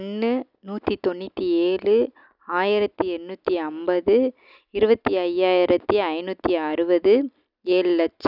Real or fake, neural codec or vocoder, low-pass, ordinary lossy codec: real; none; 5.4 kHz; none